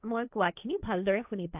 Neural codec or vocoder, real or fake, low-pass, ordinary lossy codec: codec, 16 kHz, 1.1 kbps, Voila-Tokenizer; fake; 3.6 kHz; AAC, 32 kbps